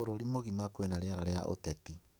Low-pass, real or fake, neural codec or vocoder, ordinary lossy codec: none; fake; codec, 44.1 kHz, 7.8 kbps, DAC; none